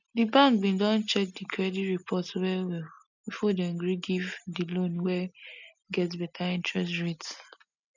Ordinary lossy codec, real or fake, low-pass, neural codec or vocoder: none; real; 7.2 kHz; none